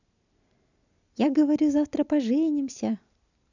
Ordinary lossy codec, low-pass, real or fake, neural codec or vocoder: none; 7.2 kHz; real; none